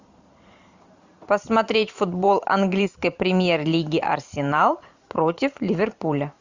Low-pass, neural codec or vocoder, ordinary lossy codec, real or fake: 7.2 kHz; vocoder, 44.1 kHz, 128 mel bands every 512 samples, BigVGAN v2; Opus, 64 kbps; fake